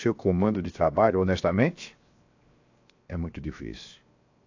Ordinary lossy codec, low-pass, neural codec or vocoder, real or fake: AAC, 48 kbps; 7.2 kHz; codec, 16 kHz, 0.7 kbps, FocalCodec; fake